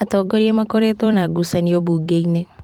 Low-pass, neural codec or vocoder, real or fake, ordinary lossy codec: 19.8 kHz; vocoder, 44.1 kHz, 128 mel bands every 256 samples, BigVGAN v2; fake; Opus, 24 kbps